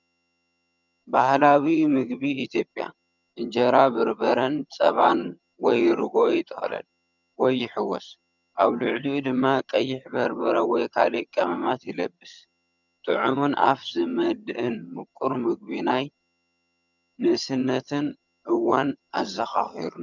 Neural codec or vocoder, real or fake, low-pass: vocoder, 22.05 kHz, 80 mel bands, HiFi-GAN; fake; 7.2 kHz